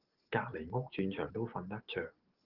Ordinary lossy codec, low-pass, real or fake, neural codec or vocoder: Opus, 16 kbps; 5.4 kHz; real; none